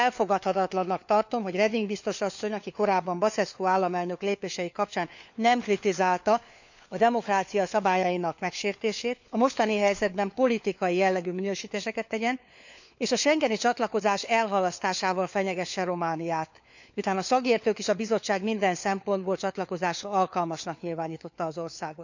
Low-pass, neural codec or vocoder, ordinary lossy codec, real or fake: 7.2 kHz; codec, 16 kHz, 4 kbps, FunCodec, trained on LibriTTS, 50 frames a second; none; fake